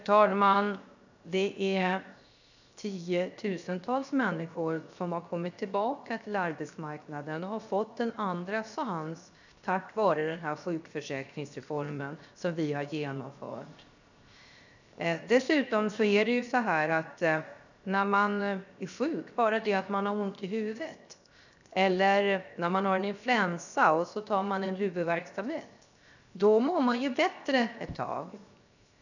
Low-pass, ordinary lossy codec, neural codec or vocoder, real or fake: 7.2 kHz; none; codec, 16 kHz, 0.7 kbps, FocalCodec; fake